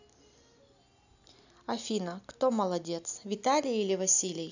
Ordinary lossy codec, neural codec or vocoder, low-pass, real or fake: none; none; 7.2 kHz; real